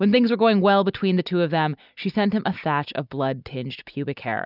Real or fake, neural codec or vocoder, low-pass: real; none; 5.4 kHz